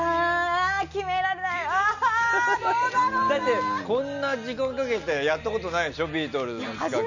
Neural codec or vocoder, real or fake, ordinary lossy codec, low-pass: none; real; none; 7.2 kHz